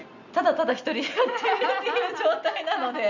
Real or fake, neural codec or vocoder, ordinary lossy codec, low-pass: real; none; Opus, 64 kbps; 7.2 kHz